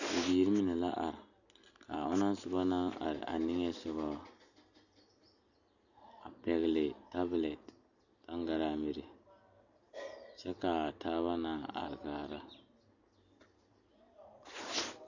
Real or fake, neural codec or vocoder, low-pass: real; none; 7.2 kHz